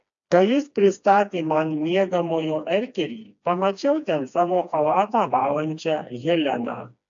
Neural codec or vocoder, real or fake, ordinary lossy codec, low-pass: codec, 16 kHz, 2 kbps, FreqCodec, smaller model; fake; AAC, 64 kbps; 7.2 kHz